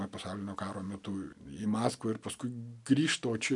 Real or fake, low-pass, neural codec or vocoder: real; 10.8 kHz; none